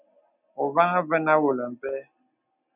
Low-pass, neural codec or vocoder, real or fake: 3.6 kHz; none; real